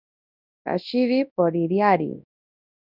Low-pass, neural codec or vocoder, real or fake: 5.4 kHz; codec, 24 kHz, 0.9 kbps, WavTokenizer, large speech release; fake